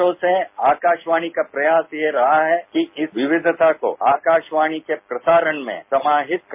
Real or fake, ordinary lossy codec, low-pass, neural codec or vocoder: real; MP3, 32 kbps; 3.6 kHz; none